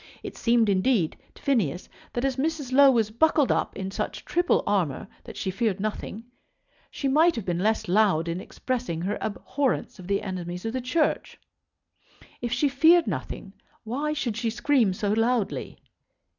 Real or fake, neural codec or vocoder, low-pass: real; none; 7.2 kHz